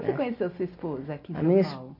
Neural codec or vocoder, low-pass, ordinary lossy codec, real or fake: none; 5.4 kHz; MP3, 32 kbps; real